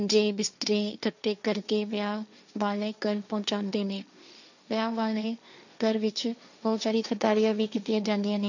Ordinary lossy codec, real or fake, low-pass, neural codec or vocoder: none; fake; 7.2 kHz; codec, 16 kHz, 1.1 kbps, Voila-Tokenizer